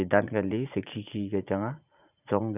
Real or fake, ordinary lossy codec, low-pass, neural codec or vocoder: fake; none; 3.6 kHz; vocoder, 44.1 kHz, 80 mel bands, Vocos